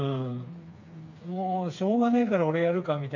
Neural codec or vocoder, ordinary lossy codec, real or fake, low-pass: codec, 16 kHz, 4 kbps, FreqCodec, smaller model; MP3, 64 kbps; fake; 7.2 kHz